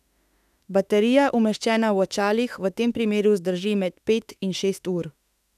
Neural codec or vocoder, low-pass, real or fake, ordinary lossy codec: autoencoder, 48 kHz, 32 numbers a frame, DAC-VAE, trained on Japanese speech; 14.4 kHz; fake; none